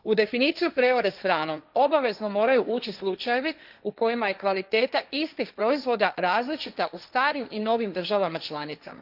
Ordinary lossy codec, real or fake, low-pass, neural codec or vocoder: none; fake; 5.4 kHz; codec, 16 kHz, 1.1 kbps, Voila-Tokenizer